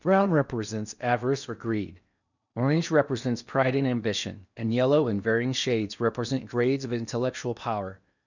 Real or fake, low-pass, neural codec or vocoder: fake; 7.2 kHz; codec, 16 kHz in and 24 kHz out, 0.8 kbps, FocalCodec, streaming, 65536 codes